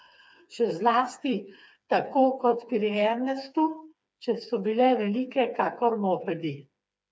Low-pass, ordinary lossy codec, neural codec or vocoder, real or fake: none; none; codec, 16 kHz, 4 kbps, FreqCodec, smaller model; fake